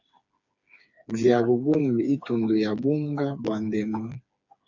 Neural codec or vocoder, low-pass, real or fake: codec, 16 kHz, 4 kbps, FreqCodec, smaller model; 7.2 kHz; fake